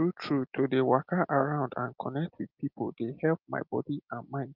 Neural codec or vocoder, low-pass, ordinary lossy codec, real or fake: none; 5.4 kHz; Opus, 24 kbps; real